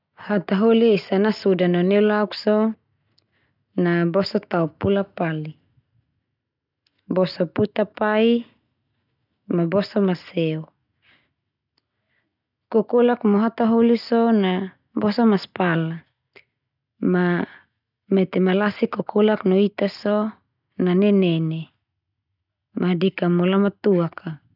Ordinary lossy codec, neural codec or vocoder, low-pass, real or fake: none; none; 5.4 kHz; real